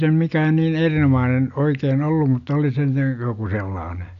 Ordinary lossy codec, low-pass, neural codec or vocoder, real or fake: none; 7.2 kHz; none; real